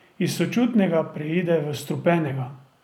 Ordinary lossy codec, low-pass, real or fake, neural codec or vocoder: none; 19.8 kHz; fake; vocoder, 44.1 kHz, 128 mel bands every 256 samples, BigVGAN v2